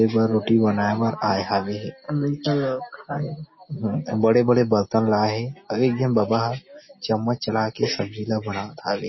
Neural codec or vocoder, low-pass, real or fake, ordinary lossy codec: none; 7.2 kHz; real; MP3, 24 kbps